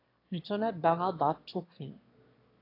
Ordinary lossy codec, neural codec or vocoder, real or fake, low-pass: AAC, 32 kbps; autoencoder, 22.05 kHz, a latent of 192 numbers a frame, VITS, trained on one speaker; fake; 5.4 kHz